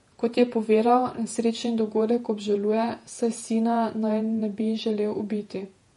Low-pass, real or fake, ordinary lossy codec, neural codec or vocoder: 19.8 kHz; fake; MP3, 48 kbps; vocoder, 48 kHz, 128 mel bands, Vocos